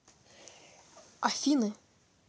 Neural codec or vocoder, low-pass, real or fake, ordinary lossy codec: none; none; real; none